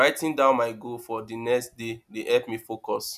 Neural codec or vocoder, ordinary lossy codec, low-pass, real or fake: none; none; 14.4 kHz; real